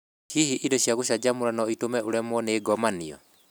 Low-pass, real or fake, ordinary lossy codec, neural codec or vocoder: none; real; none; none